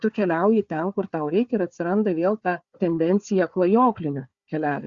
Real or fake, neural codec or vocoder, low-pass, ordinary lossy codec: fake; codec, 16 kHz, 4 kbps, FunCodec, trained on Chinese and English, 50 frames a second; 7.2 kHz; Opus, 64 kbps